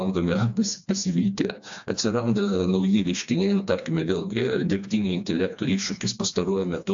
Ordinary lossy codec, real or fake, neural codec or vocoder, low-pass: AAC, 64 kbps; fake; codec, 16 kHz, 2 kbps, FreqCodec, smaller model; 7.2 kHz